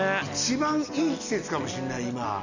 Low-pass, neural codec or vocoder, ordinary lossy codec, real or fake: 7.2 kHz; none; none; real